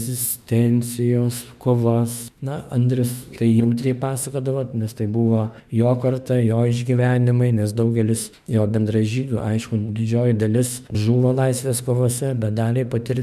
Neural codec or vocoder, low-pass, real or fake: autoencoder, 48 kHz, 32 numbers a frame, DAC-VAE, trained on Japanese speech; 14.4 kHz; fake